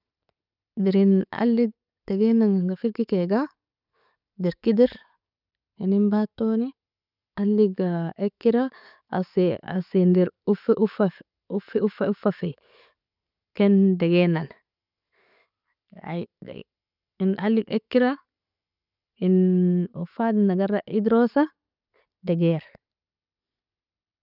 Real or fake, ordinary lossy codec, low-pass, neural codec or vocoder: real; none; 5.4 kHz; none